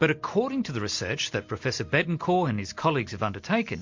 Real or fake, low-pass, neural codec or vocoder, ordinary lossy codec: real; 7.2 kHz; none; MP3, 48 kbps